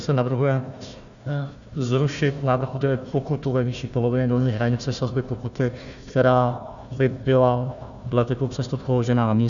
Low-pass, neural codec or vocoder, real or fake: 7.2 kHz; codec, 16 kHz, 1 kbps, FunCodec, trained on Chinese and English, 50 frames a second; fake